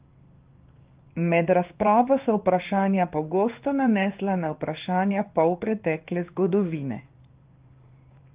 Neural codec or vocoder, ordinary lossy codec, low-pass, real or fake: codec, 16 kHz in and 24 kHz out, 1 kbps, XY-Tokenizer; Opus, 32 kbps; 3.6 kHz; fake